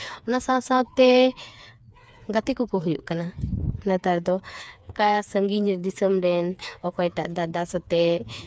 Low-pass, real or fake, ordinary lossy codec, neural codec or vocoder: none; fake; none; codec, 16 kHz, 4 kbps, FreqCodec, smaller model